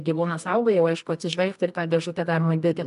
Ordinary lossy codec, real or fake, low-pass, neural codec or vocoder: MP3, 96 kbps; fake; 10.8 kHz; codec, 24 kHz, 0.9 kbps, WavTokenizer, medium music audio release